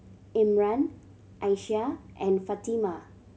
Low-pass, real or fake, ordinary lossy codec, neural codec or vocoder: none; real; none; none